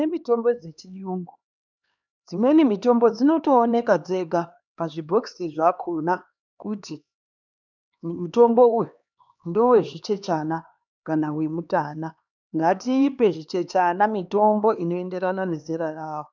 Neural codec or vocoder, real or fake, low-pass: codec, 16 kHz, 2 kbps, X-Codec, HuBERT features, trained on LibriSpeech; fake; 7.2 kHz